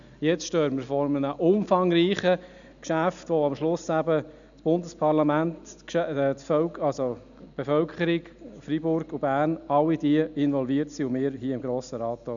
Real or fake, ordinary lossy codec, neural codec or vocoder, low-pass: real; none; none; 7.2 kHz